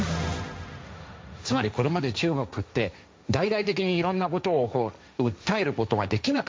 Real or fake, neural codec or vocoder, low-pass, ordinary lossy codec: fake; codec, 16 kHz, 1.1 kbps, Voila-Tokenizer; none; none